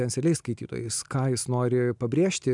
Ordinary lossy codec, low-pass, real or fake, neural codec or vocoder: MP3, 96 kbps; 10.8 kHz; real; none